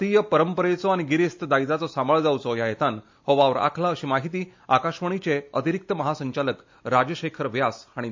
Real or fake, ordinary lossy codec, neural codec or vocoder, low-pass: real; MP3, 48 kbps; none; 7.2 kHz